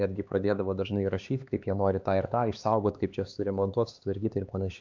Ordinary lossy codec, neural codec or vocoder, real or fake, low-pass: MP3, 64 kbps; codec, 16 kHz, 2 kbps, X-Codec, HuBERT features, trained on LibriSpeech; fake; 7.2 kHz